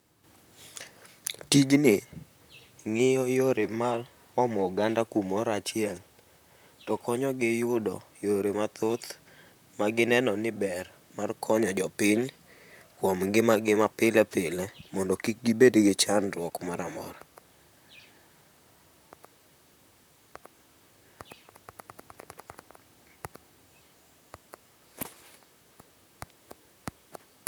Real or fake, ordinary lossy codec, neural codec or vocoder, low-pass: fake; none; vocoder, 44.1 kHz, 128 mel bands, Pupu-Vocoder; none